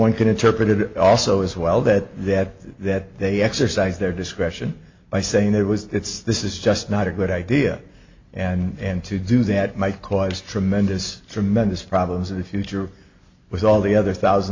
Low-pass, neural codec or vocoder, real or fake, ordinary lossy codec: 7.2 kHz; none; real; MP3, 48 kbps